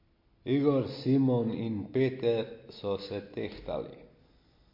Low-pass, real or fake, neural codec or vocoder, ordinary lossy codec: 5.4 kHz; real; none; MP3, 32 kbps